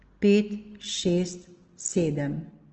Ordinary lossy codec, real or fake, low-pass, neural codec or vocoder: Opus, 16 kbps; real; 7.2 kHz; none